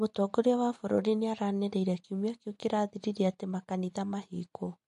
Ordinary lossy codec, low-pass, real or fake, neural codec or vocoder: MP3, 48 kbps; 14.4 kHz; real; none